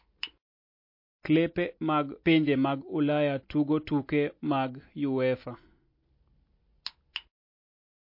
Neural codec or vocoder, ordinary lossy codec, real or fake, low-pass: none; MP3, 32 kbps; real; 5.4 kHz